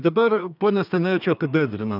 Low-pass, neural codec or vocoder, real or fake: 5.4 kHz; codec, 32 kHz, 1.9 kbps, SNAC; fake